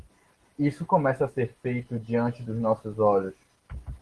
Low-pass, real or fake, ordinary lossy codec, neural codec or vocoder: 10.8 kHz; real; Opus, 16 kbps; none